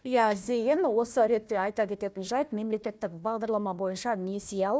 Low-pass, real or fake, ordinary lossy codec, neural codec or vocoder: none; fake; none; codec, 16 kHz, 1 kbps, FunCodec, trained on Chinese and English, 50 frames a second